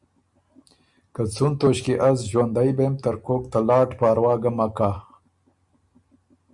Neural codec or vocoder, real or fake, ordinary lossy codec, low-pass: none; real; Opus, 64 kbps; 10.8 kHz